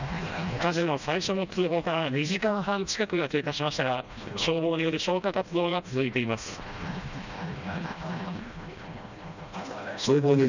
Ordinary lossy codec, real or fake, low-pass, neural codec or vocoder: none; fake; 7.2 kHz; codec, 16 kHz, 1 kbps, FreqCodec, smaller model